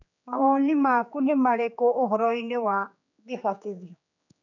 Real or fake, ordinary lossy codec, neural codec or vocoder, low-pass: fake; none; codec, 16 kHz, 4 kbps, X-Codec, HuBERT features, trained on general audio; 7.2 kHz